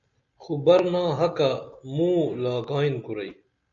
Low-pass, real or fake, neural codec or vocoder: 7.2 kHz; real; none